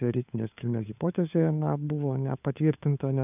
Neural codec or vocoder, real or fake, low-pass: codec, 16 kHz, 4 kbps, FunCodec, trained on LibriTTS, 50 frames a second; fake; 3.6 kHz